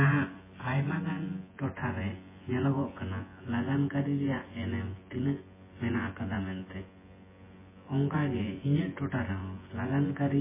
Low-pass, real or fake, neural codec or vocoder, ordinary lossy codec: 3.6 kHz; fake; vocoder, 24 kHz, 100 mel bands, Vocos; MP3, 16 kbps